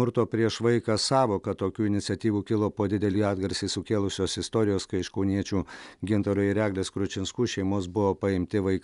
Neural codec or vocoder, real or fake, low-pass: none; real; 10.8 kHz